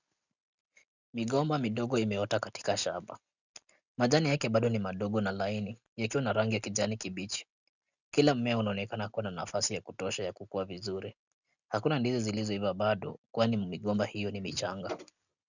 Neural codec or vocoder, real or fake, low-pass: none; real; 7.2 kHz